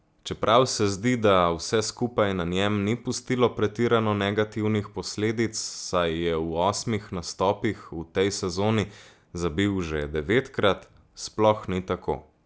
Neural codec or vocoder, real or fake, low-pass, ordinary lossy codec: none; real; none; none